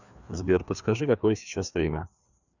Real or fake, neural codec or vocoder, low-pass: fake; codec, 16 kHz, 2 kbps, FreqCodec, larger model; 7.2 kHz